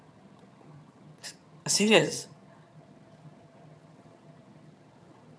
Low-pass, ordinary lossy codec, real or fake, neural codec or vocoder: none; none; fake; vocoder, 22.05 kHz, 80 mel bands, HiFi-GAN